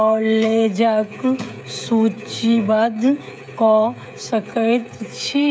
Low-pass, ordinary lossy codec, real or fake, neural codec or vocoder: none; none; fake; codec, 16 kHz, 16 kbps, FreqCodec, smaller model